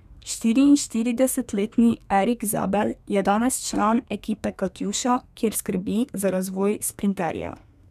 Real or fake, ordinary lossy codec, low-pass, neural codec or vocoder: fake; none; 14.4 kHz; codec, 32 kHz, 1.9 kbps, SNAC